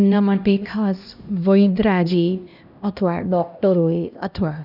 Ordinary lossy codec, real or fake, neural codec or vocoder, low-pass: none; fake; codec, 16 kHz, 1 kbps, X-Codec, HuBERT features, trained on LibriSpeech; 5.4 kHz